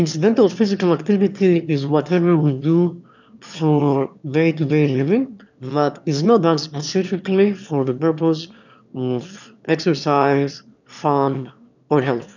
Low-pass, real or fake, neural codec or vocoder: 7.2 kHz; fake; autoencoder, 22.05 kHz, a latent of 192 numbers a frame, VITS, trained on one speaker